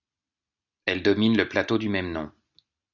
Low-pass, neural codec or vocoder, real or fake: 7.2 kHz; none; real